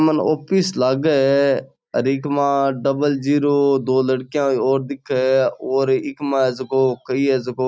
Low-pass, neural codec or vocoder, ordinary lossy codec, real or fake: none; none; none; real